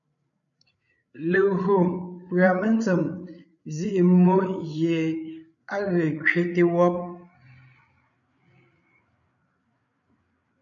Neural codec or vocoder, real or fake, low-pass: codec, 16 kHz, 8 kbps, FreqCodec, larger model; fake; 7.2 kHz